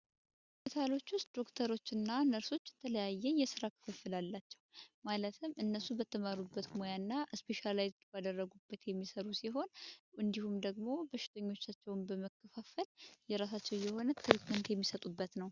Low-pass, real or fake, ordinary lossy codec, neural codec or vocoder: 7.2 kHz; real; Opus, 64 kbps; none